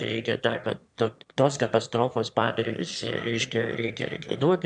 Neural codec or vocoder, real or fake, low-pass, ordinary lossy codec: autoencoder, 22.05 kHz, a latent of 192 numbers a frame, VITS, trained on one speaker; fake; 9.9 kHz; MP3, 96 kbps